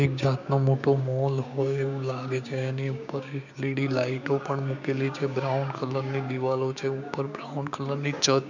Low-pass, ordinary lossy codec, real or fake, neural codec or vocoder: 7.2 kHz; none; fake; codec, 16 kHz, 6 kbps, DAC